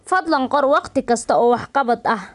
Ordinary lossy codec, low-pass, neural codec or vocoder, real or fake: none; 10.8 kHz; none; real